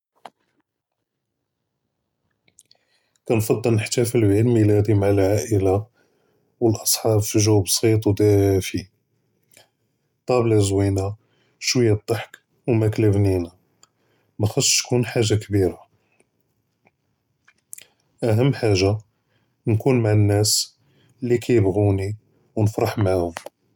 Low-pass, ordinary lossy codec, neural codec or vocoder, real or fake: 19.8 kHz; none; none; real